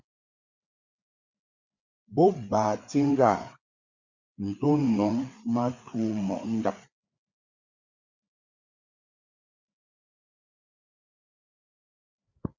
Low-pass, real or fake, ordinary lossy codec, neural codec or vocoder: 7.2 kHz; fake; Opus, 64 kbps; codec, 16 kHz, 4 kbps, FreqCodec, larger model